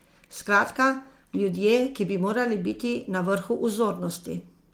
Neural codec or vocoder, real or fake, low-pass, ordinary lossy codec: none; real; 19.8 kHz; Opus, 24 kbps